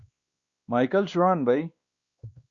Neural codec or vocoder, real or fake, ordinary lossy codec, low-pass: codec, 16 kHz, 2 kbps, X-Codec, WavLM features, trained on Multilingual LibriSpeech; fake; Opus, 64 kbps; 7.2 kHz